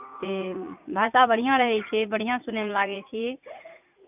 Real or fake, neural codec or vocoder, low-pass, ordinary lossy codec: fake; vocoder, 44.1 kHz, 80 mel bands, Vocos; 3.6 kHz; none